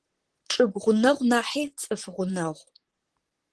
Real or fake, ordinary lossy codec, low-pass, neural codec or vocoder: fake; Opus, 16 kbps; 10.8 kHz; vocoder, 44.1 kHz, 128 mel bands, Pupu-Vocoder